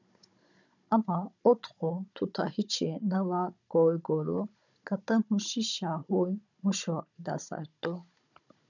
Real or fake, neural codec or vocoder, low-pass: fake; codec, 16 kHz, 16 kbps, FunCodec, trained on Chinese and English, 50 frames a second; 7.2 kHz